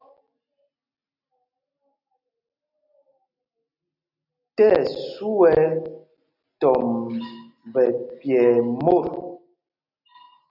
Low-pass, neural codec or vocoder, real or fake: 5.4 kHz; none; real